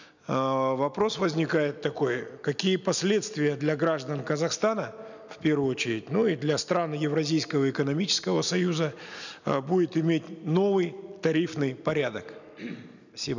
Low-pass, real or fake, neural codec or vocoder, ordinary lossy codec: 7.2 kHz; real; none; none